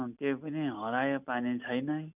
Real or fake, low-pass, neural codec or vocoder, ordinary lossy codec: real; 3.6 kHz; none; none